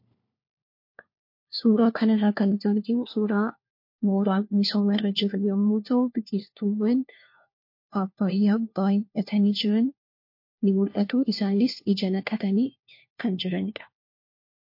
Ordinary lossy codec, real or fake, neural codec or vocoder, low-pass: MP3, 32 kbps; fake; codec, 16 kHz, 1 kbps, FunCodec, trained on LibriTTS, 50 frames a second; 5.4 kHz